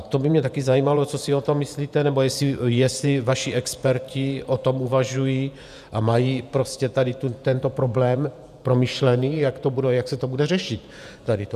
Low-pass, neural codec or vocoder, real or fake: 14.4 kHz; vocoder, 48 kHz, 128 mel bands, Vocos; fake